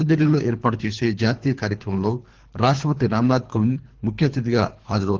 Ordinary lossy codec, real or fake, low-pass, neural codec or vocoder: Opus, 16 kbps; fake; 7.2 kHz; codec, 24 kHz, 3 kbps, HILCodec